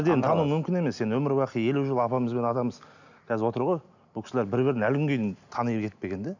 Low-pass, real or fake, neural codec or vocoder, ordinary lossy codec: 7.2 kHz; real; none; none